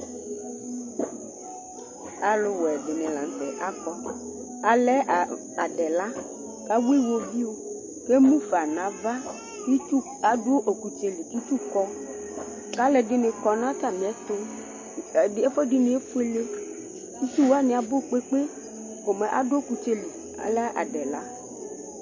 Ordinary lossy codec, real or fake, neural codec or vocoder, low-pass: MP3, 32 kbps; real; none; 7.2 kHz